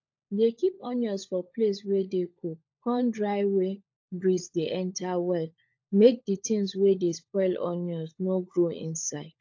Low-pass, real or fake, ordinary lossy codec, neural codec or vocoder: 7.2 kHz; fake; AAC, 48 kbps; codec, 16 kHz, 16 kbps, FunCodec, trained on LibriTTS, 50 frames a second